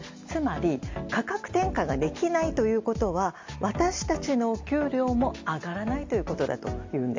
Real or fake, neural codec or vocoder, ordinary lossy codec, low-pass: real; none; MP3, 48 kbps; 7.2 kHz